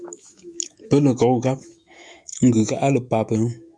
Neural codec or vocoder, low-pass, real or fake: autoencoder, 48 kHz, 128 numbers a frame, DAC-VAE, trained on Japanese speech; 9.9 kHz; fake